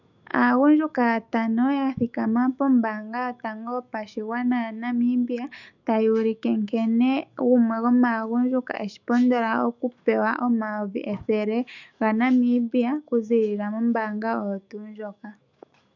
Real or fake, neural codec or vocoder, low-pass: fake; autoencoder, 48 kHz, 128 numbers a frame, DAC-VAE, trained on Japanese speech; 7.2 kHz